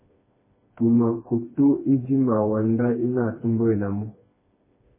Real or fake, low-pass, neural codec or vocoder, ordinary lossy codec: fake; 3.6 kHz; codec, 16 kHz, 2 kbps, FreqCodec, smaller model; MP3, 16 kbps